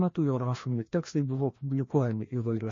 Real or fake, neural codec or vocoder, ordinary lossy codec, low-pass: fake; codec, 16 kHz, 1 kbps, FreqCodec, larger model; MP3, 32 kbps; 7.2 kHz